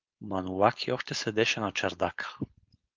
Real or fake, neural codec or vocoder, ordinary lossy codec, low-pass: fake; codec, 16 kHz, 4.8 kbps, FACodec; Opus, 32 kbps; 7.2 kHz